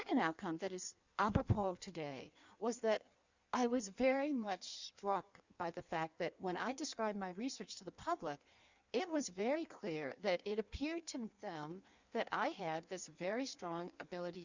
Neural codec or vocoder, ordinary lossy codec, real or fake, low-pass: codec, 16 kHz in and 24 kHz out, 1.1 kbps, FireRedTTS-2 codec; Opus, 64 kbps; fake; 7.2 kHz